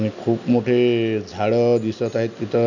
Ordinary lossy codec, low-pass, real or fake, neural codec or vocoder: none; 7.2 kHz; real; none